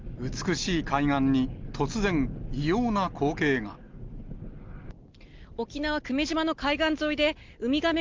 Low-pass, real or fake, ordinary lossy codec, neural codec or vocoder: 7.2 kHz; real; Opus, 32 kbps; none